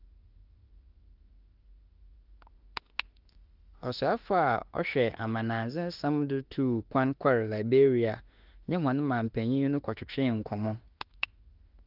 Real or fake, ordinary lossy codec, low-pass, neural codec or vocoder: fake; Opus, 24 kbps; 5.4 kHz; autoencoder, 48 kHz, 32 numbers a frame, DAC-VAE, trained on Japanese speech